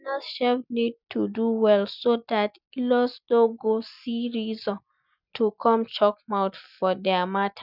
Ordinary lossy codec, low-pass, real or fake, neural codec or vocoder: none; 5.4 kHz; real; none